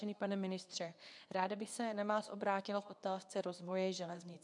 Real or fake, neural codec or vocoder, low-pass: fake; codec, 24 kHz, 0.9 kbps, WavTokenizer, medium speech release version 2; 10.8 kHz